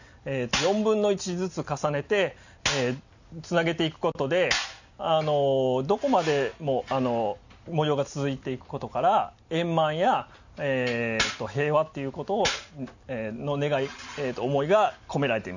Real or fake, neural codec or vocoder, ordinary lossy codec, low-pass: real; none; none; 7.2 kHz